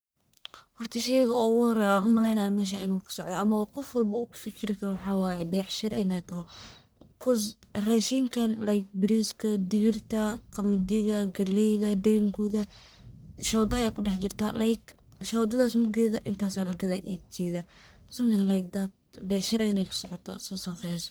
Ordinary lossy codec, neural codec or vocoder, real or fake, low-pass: none; codec, 44.1 kHz, 1.7 kbps, Pupu-Codec; fake; none